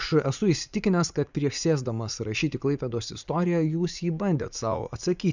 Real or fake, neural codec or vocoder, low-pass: fake; vocoder, 44.1 kHz, 80 mel bands, Vocos; 7.2 kHz